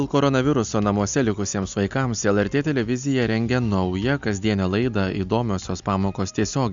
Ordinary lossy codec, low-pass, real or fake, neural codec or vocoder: Opus, 64 kbps; 7.2 kHz; real; none